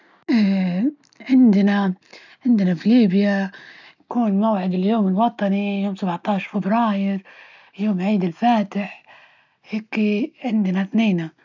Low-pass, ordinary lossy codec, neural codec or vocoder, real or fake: 7.2 kHz; none; none; real